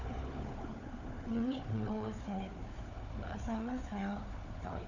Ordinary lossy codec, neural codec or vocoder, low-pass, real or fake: none; codec, 16 kHz, 4 kbps, FunCodec, trained on Chinese and English, 50 frames a second; 7.2 kHz; fake